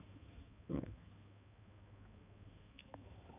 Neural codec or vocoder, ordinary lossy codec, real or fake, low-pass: codec, 16 kHz, 2 kbps, X-Codec, HuBERT features, trained on balanced general audio; none; fake; 3.6 kHz